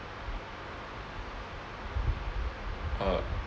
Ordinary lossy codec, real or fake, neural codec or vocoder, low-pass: none; real; none; none